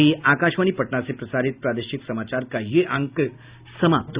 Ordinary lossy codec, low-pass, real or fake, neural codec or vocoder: Opus, 64 kbps; 3.6 kHz; real; none